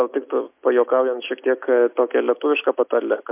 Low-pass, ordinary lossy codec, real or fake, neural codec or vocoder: 3.6 kHz; MP3, 32 kbps; real; none